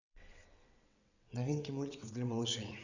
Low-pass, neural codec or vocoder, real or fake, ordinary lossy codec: 7.2 kHz; vocoder, 22.05 kHz, 80 mel bands, WaveNeXt; fake; none